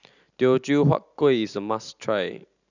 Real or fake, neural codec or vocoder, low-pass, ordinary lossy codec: real; none; 7.2 kHz; none